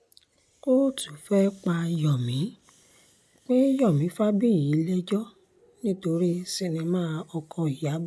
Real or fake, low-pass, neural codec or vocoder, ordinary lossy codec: real; none; none; none